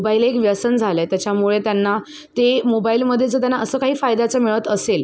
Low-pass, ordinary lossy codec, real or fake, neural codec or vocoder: none; none; real; none